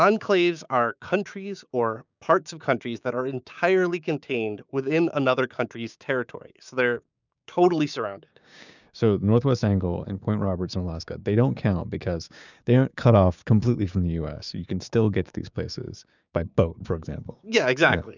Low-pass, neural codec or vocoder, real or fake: 7.2 kHz; codec, 16 kHz, 6 kbps, DAC; fake